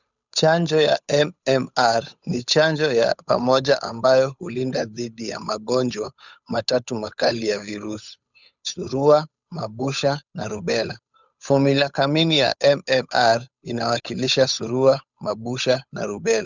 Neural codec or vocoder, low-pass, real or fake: codec, 16 kHz, 8 kbps, FunCodec, trained on Chinese and English, 25 frames a second; 7.2 kHz; fake